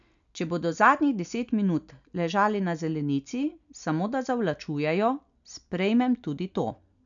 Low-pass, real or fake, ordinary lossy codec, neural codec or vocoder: 7.2 kHz; real; none; none